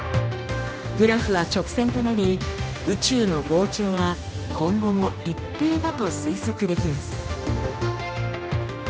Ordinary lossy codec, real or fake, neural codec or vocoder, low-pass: none; fake; codec, 16 kHz, 1 kbps, X-Codec, HuBERT features, trained on general audio; none